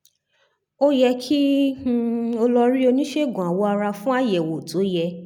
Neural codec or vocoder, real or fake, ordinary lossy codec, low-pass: none; real; none; 19.8 kHz